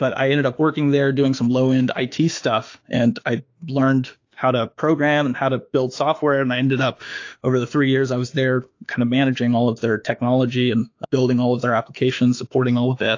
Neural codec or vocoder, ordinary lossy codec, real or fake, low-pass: autoencoder, 48 kHz, 32 numbers a frame, DAC-VAE, trained on Japanese speech; AAC, 48 kbps; fake; 7.2 kHz